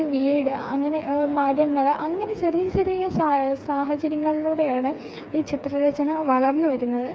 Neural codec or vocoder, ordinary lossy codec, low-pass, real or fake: codec, 16 kHz, 4 kbps, FreqCodec, smaller model; none; none; fake